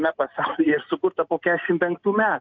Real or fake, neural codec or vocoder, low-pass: real; none; 7.2 kHz